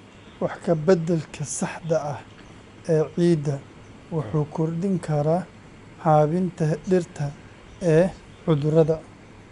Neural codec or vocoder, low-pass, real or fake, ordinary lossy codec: none; 10.8 kHz; real; none